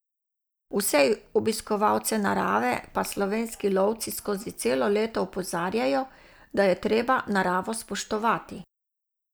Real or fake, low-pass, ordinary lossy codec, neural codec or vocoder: real; none; none; none